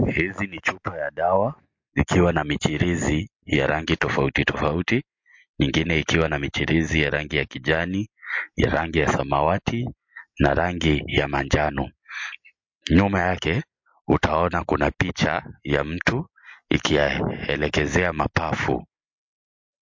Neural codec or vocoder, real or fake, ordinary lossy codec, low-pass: none; real; MP3, 48 kbps; 7.2 kHz